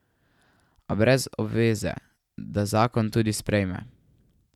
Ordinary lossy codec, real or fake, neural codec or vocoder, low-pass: none; fake; vocoder, 44.1 kHz, 128 mel bands every 512 samples, BigVGAN v2; 19.8 kHz